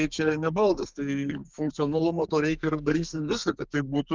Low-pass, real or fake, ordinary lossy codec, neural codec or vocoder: 7.2 kHz; fake; Opus, 32 kbps; codec, 32 kHz, 1.9 kbps, SNAC